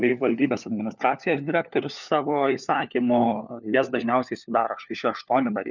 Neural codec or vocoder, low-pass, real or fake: codec, 16 kHz, 4 kbps, FunCodec, trained on LibriTTS, 50 frames a second; 7.2 kHz; fake